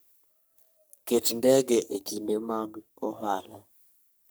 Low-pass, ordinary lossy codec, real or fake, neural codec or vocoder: none; none; fake; codec, 44.1 kHz, 3.4 kbps, Pupu-Codec